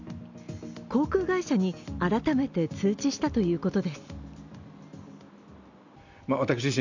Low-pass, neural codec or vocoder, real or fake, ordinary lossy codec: 7.2 kHz; none; real; none